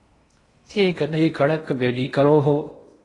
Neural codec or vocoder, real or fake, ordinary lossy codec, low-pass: codec, 16 kHz in and 24 kHz out, 0.8 kbps, FocalCodec, streaming, 65536 codes; fake; AAC, 32 kbps; 10.8 kHz